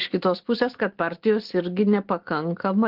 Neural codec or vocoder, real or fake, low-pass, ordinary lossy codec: none; real; 5.4 kHz; Opus, 16 kbps